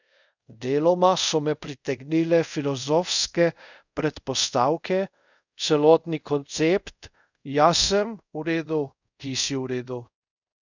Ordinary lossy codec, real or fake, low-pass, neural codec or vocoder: none; fake; 7.2 kHz; codec, 24 kHz, 0.5 kbps, DualCodec